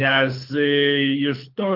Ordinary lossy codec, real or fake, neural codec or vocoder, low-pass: Opus, 24 kbps; fake; codec, 24 kHz, 6 kbps, HILCodec; 5.4 kHz